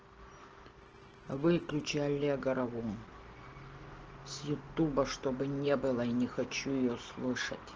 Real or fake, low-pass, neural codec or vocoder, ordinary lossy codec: real; 7.2 kHz; none; Opus, 16 kbps